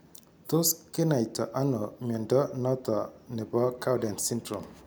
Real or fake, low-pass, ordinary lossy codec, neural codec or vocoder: real; none; none; none